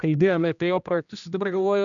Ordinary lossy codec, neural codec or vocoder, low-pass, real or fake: MP3, 96 kbps; codec, 16 kHz, 1 kbps, X-Codec, HuBERT features, trained on general audio; 7.2 kHz; fake